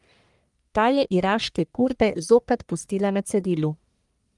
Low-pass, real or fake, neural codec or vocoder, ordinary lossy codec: 10.8 kHz; fake; codec, 44.1 kHz, 1.7 kbps, Pupu-Codec; Opus, 32 kbps